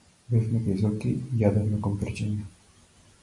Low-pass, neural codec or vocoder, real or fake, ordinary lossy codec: 10.8 kHz; none; real; MP3, 48 kbps